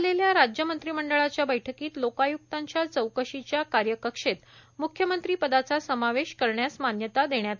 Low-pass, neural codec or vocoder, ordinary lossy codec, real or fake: 7.2 kHz; none; none; real